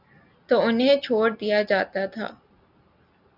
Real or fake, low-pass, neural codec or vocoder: real; 5.4 kHz; none